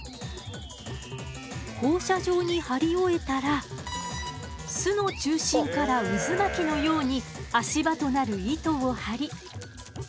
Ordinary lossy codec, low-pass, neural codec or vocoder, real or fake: none; none; none; real